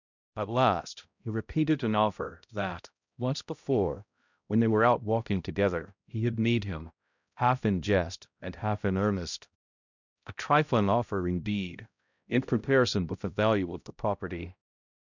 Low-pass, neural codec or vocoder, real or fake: 7.2 kHz; codec, 16 kHz, 0.5 kbps, X-Codec, HuBERT features, trained on balanced general audio; fake